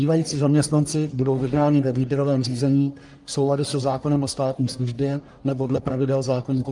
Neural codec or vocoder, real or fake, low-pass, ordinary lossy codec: codec, 44.1 kHz, 1.7 kbps, Pupu-Codec; fake; 10.8 kHz; Opus, 32 kbps